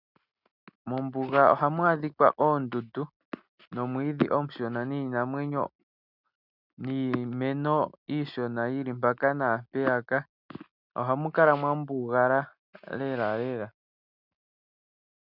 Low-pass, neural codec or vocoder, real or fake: 5.4 kHz; none; real